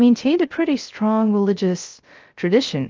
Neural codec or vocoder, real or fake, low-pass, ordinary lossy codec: codec, 16 kHz, 0.3 kbps, FocalCodec; fake; 7.2 kHz; Opus, 24 kbps